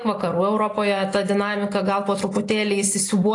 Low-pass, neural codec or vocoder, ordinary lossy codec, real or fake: 10.8 kHz; none; AAC, 48 kbps; real